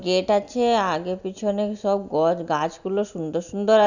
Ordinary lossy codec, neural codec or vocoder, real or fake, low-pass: none; none; real; 7.2 kHz